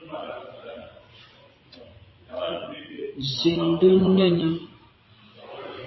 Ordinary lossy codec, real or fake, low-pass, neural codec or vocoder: MP3, 24 kbps; fake; 7.2 kHz; vocoder, 44.1 kHz, 80 mel bands, Vocos